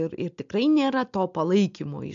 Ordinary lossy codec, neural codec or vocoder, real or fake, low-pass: MP3, 64 kbps; none; real; 7.2 kHz